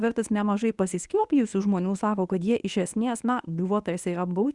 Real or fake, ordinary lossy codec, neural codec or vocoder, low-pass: fake; Opus, 64 kbps; codec, 24 kHz, 0.9 kbps, WavTokenizer, medium speech release version 1; 10.8 kHz